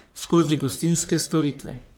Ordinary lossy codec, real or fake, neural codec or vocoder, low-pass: none; fake; codec, 44.1 kHz, 1.7 kbps, Pupu-Codec; none